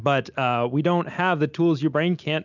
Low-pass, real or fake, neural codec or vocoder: 7.2 kHz; real; none